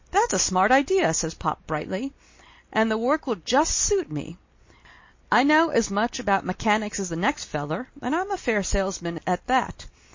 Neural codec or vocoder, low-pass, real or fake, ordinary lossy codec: none; 7.2 kHz; real; MP3, 32 kbps